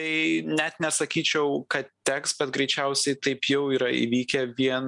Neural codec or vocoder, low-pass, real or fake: none; 10.8 kHz; real